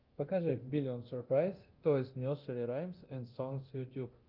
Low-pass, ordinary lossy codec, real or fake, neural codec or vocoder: 5.4 kHz; Opus, 24 kbps; fake; codec, 24 kHz, 0.9 kbps, DualCodec